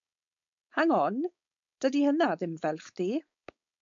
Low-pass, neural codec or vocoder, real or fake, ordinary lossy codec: 7.2 kHz; codec, 16 kHz, 4.8 kbps, FACodec; fake; MP3, 64 kbps